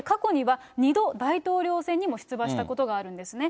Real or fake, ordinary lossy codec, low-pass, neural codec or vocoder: real; none; none; none